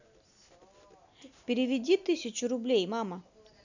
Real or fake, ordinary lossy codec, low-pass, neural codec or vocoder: real; none; 7.2 kHz; none